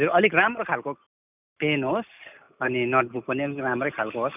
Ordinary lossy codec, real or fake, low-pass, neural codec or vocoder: none; real; 3.6 kHz; none